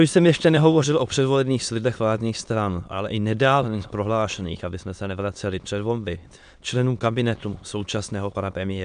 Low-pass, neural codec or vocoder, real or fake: 9.9 kHz; autoencoder, 22.05 kHz, a latent of 192 numbers a frame, VITS, trained on many speakers; fake